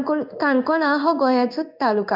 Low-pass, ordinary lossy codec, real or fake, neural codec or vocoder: 5.4 kHz; none; fake; codec, 16 kHz in and 24 kHz out, 1 kbps, XY-Tokenizer